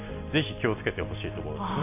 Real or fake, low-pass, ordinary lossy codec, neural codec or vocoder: real; 3.6 kHz; none; none